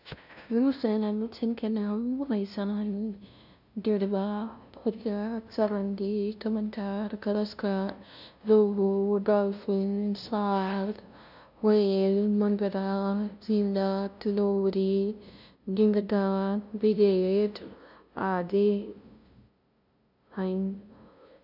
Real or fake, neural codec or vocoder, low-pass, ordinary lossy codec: fake; codec, 16 kHz, 0.5 kbps, FunCodec, trained on LibriTTS, 25 frames a second; 5.4 kHz; none